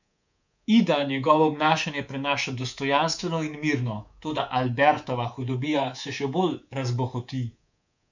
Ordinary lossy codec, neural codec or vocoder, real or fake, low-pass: none; codec, 24 kHz, 3.1 kbps, DualCodec; fake; 7.2 kHz